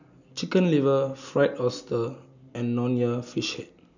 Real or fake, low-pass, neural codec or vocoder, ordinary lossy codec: real; 7.2 kHz; none; none